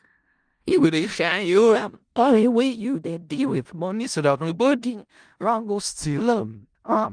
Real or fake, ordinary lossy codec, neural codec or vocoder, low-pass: fake; Opus, 32 kbps; codec, 16 kHz in and 24 kHz out, 0.4 kbps, LongCat-Audio-Codec, four codebook decoder; 9.9 kHz